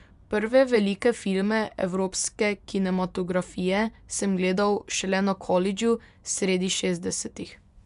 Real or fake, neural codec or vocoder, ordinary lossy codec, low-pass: fake; vocoder, 24 kHz, 100 mel bands, Vocos; none; 10.8 kHz